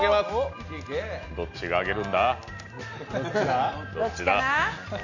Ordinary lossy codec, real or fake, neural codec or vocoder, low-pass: none; real; none; 7.2 kHz